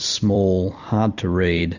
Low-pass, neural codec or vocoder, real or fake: 7.2 kHz; vocoder, 44.1 kHz, 128 mel bands every 256 samples, BigVGAN v2; fake